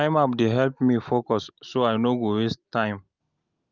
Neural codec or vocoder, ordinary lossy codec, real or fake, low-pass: none; Opus, 24 kbps; real; 7.2 kHz